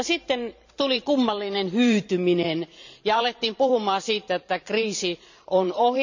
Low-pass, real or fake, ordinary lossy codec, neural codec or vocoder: 7.2 kHz; fake; none; vocoder, 44.1 kHz, 128 mel bands every 512 samples, BigVGAN v2